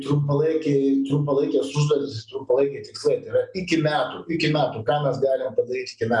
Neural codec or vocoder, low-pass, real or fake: none; 10.8 kHz; real